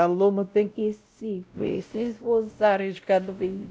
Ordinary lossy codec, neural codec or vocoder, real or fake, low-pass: none; codec, 16 kHz, 0.5 kbps, X-Codec, WavLM features, trained on Multilingual LibriSpeech; fake; none